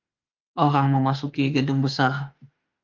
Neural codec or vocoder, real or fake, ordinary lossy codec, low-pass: autoencoder, 48 kHz, 32 numbers a frame, DAC-VAE, trained on Japanese speech; fake; Opus, 24 kbps; 7.2 kHz